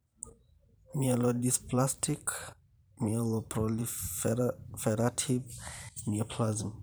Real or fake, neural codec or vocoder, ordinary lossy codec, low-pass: fake; vocoder, 44.1 kHz, 128 mel bands every 512 samples, BigVGAN v2; none; none